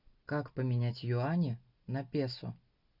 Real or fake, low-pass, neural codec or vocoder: real; 5.4 kHz; none